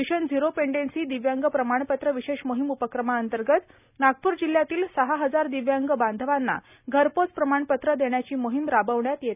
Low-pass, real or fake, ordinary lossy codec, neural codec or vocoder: 3.6 kHz; real; none; none